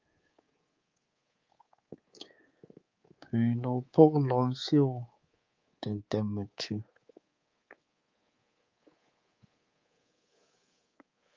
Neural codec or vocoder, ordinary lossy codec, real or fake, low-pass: codec, 24 kHz, 3.1 kbps, DualCodec; Opus, 24 kbps; fake; 7.2 kHz